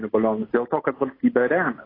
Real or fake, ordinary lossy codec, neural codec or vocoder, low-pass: real; AAC, 24 kbps; none; 5.4 kHz